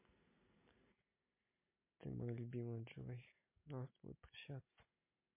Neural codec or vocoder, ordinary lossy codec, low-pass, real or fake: none; MP3, 24 kbps; 3.6 kHz; real